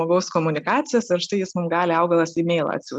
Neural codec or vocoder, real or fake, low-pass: none; real; 10.8 kHz